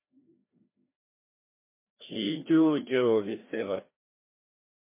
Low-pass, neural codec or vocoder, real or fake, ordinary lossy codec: 3.6 kHz; codec, 16 kHz, 1 kbps, FreqCodec, larger model; fake; MP3, 24 kbps